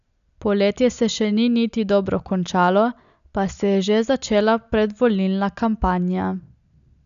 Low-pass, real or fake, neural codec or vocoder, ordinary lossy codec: 7.2 kHz; real; none; none